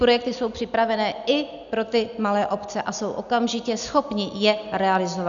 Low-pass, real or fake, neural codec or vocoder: 7.2 kHz; real; none